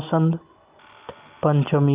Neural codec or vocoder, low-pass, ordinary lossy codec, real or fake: none; 3.6 kHz; Opus, 24 kbps; real